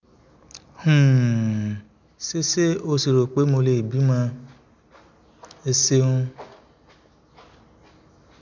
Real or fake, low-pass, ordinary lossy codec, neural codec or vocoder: real; 7.2 kHz; none; none